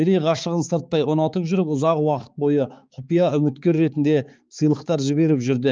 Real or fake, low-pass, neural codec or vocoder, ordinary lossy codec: fake; 7.2 kHz; codec, 16 kHz, 4 kbps, X-Codec, HuBERT features, trained on balanced general audio; Opus, 24 kbps